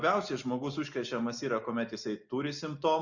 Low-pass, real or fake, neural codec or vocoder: 7.2 kHz; real; none